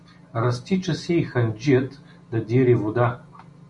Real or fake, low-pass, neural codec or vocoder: real; 10.8 kHz; none